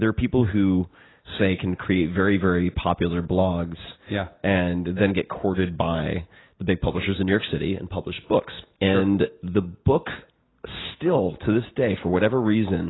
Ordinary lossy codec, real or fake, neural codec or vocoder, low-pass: AAC, 16 kbps; real; none; 7.2 kHz